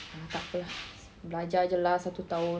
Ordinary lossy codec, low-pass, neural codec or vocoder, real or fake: none; none; none; real